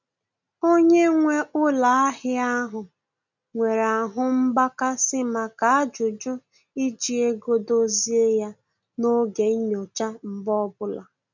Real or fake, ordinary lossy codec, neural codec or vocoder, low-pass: real; none; none; 7.2 kHz